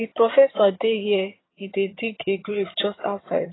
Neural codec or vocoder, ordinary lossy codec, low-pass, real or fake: vocoder, 22.05 kHz, 80 mel bands, HiFi-GAN; AAC, 16 kbps; 7.2 kHz; fake